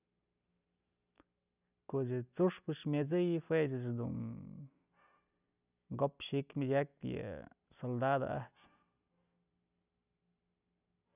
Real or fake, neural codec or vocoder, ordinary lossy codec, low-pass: real; none; none; 3.6 kHz